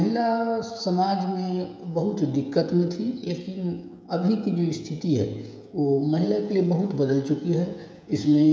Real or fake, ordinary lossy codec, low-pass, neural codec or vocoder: fake; none; none; codec, 16 kHz, 16 kbps, FreqCodec, smaller model